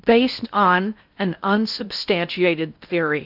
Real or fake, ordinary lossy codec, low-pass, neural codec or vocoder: fake; AAC, 48 kbps; 5.4 kHz; codec, 16 kHz in and 24 kHz out, 0.6 kbps, FocalCodec, streaming, 4096 codes